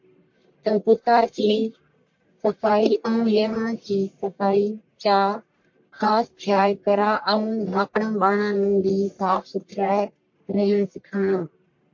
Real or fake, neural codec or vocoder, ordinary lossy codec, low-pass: fake; codec, 44.1 kHz, 1.7 kbps, Pupu-Codec; MP3, 48 kbps; 7.2 kHz